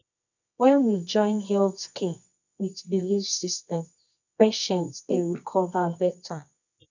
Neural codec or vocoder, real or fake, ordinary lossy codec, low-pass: codec, 24 kHz, 0.9 kbps, WavTokenizer, medium music audio release; fake; none; 7.2 kHz